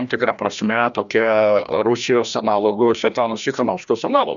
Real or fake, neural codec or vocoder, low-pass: fake; codec, 16 kHz, 1 kbps, FreqCodec, larger model; 7.2 kHz